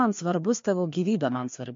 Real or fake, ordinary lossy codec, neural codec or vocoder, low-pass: fake; MP3, 48 kbps; codec, 16 kHz, 2 kbps, FreqCodec, larger model; 7.2 kHz